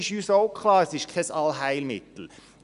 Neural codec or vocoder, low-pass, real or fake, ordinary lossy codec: none; 10.8 kHz; real; none